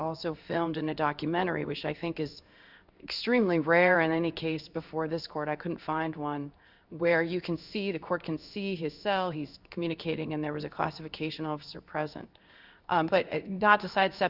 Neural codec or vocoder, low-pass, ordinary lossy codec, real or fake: codec, 16 kHz in and 24 kHz out, 1 kbps, XY-Tokenizer; 5.4 kHz; Opus, 64 kbps; fake